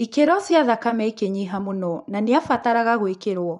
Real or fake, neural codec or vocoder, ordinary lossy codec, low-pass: fake; vocoder, 24 kHz, 100 mel bands, Vocos; none; 10.8 kHz